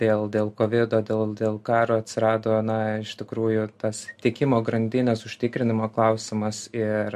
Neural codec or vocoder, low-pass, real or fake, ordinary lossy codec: none; 14.4 kHz; real; MP3, 64 kbps